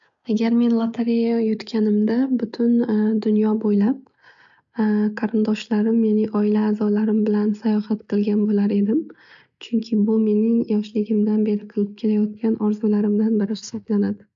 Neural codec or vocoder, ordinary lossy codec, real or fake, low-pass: none; MP3, 64 kbps; real; 7.2 kHz